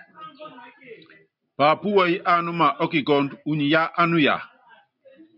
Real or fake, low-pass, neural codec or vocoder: real; 5.4 kHz; none